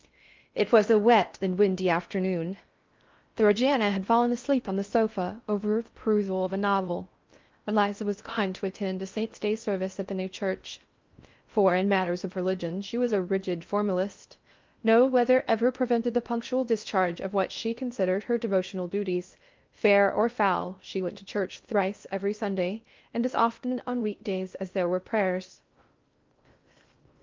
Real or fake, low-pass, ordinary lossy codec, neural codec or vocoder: fake; 7.2 kHz; Opus, 24 kbps; codec, 16 kHz in and 24 kHz out, 0.6 kbps, FocalCodec, streaming, 4096 codes